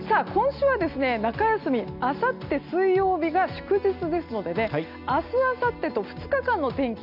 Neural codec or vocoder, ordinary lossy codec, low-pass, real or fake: none; none; 5.4 kHz; real